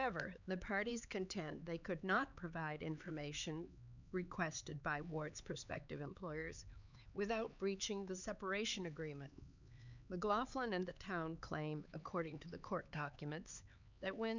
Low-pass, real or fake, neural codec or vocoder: 7.2 kHz; fake; codec, 16 kHz, 4 kbps, X-Codec, HuBERT features, trained on LibriSpeech